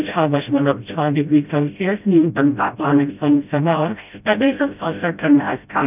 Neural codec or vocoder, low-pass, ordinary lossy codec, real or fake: codec, 16 kHz, 0.5 kbps, FreqCodec, smaller model; 3.6 kHz; none; fake